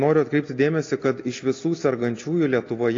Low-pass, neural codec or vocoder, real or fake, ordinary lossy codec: 7.2 kHz; none; real; AAC, 32 kbps